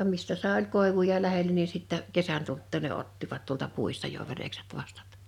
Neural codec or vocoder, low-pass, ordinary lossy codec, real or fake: none; 19.8 kHz; none; real